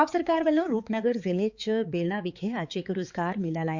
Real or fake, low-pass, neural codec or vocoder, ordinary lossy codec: fake; 7.2 kHz; codec, 16 kHz, 4 kbps, X-Codec, HuBERT features, trained on balanced general audio; Opus, 64 kbps